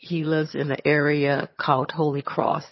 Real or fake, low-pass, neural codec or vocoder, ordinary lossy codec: fake; 7.2 kHz; vocoder, 22.05 kHz, 80 mel bands, HiFi-GAN; MP3, 24 kbps